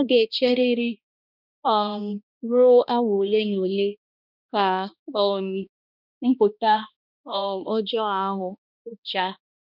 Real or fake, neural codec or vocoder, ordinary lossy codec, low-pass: fake; codec, 16 kHz, 1 kbps, X-Codec, HuBERT features, trained on balanced general audio; none; 5.4 kHz